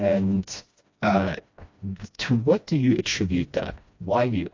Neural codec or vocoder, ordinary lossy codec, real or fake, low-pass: codec, 16 kHz, 1 kbps, FreqCodec, smaller model; AAC, 48 kbps; fake; 7.2 kHz